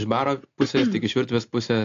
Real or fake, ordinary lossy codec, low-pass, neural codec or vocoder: real; AAC, 48 kbps; 7.2 kHz; none